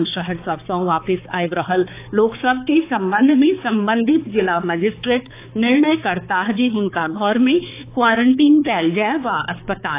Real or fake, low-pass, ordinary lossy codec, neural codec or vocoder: fake; 3.6 kHz; AAC, 24 kbps; codec, 16 kHz, 4 kbps, X-Codec, HuBERT features, trained on balanced general audio